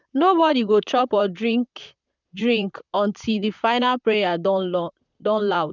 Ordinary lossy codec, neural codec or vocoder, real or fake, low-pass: none; vocoder, 44.1 kHz, 128 mel bands, Pupu-Vocoder; fake; 7.2 kHz